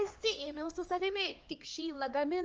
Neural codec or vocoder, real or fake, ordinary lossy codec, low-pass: codec, 16 kHz, 2 kbps, X-Codec, HuBERT features, trained on LibriSpeech; fake; Opus, 24 kbps; 7.2 kHz